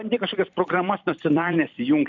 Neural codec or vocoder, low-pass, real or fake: vocoder, 44.1 kHz, 128 mel bands every 256 samples, BigVGAN v2; 7.2 kHz; fake